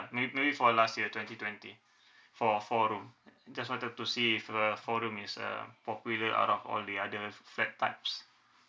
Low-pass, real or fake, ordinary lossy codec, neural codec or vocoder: none; real; none; none